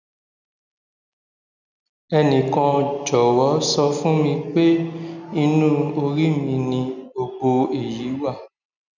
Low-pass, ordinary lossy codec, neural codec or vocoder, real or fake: 7.2 kHz; none; none; real